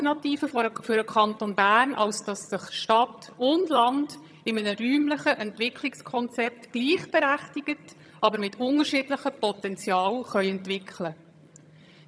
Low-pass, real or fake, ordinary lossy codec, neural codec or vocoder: none; fake; none; vocoder, 22.05 kHz, 80 mel bands, HiFi-GAN